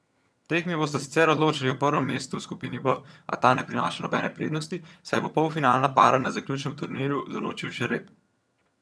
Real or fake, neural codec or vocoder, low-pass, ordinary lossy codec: fake; vocoder, 22.05 kHz, 80 mel bands, HiFi-GAN; none; none